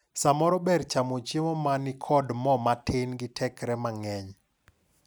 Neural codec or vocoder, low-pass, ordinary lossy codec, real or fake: none; none; none; real